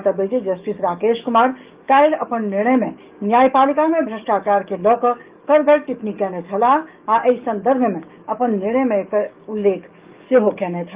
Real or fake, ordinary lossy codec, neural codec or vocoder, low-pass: fake; Opus, 32 kbps; codec, 44.1 kHz, 7.8 kbps, DAC; 3.6 kHz